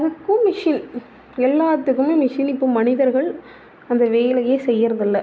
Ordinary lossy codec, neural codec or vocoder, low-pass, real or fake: none; none; none; real